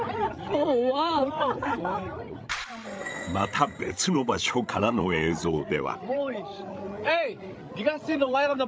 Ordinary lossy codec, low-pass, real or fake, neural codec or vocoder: none; none; fake; codec, 16 kHz, 16 kbps, FreqCodec, larger model